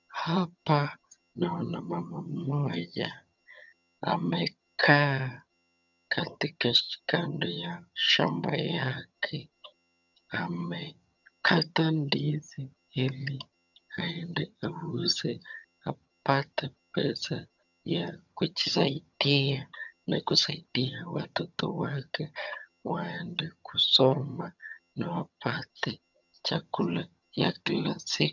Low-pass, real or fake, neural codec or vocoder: 7.2 kHz; fake; vocoder, 22.05 kHz, 80 mel bands, HiFi-GAN